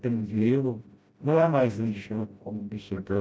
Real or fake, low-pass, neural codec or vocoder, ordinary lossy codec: fake; none; codec, 16 kHz, 0.5 kbps, FreqCodec, smaller model; none